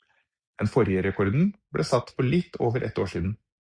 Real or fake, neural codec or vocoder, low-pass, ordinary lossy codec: real; none; 9.9 kHz; Opus, 64 kbps